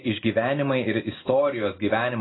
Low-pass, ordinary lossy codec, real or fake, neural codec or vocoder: 7.2 kHz; AAC, 16 kbps; real; none